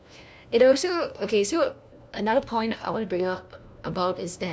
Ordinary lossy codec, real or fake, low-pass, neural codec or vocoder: none; fake; none; codec, 16 kHz, 1 kbps, FunCodec, trained on LibriTTS, 50 frames a second